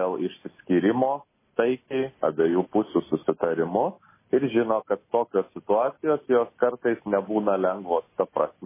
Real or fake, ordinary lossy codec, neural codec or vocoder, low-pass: real; MP3, 16 kbps; none; 3.6 kHz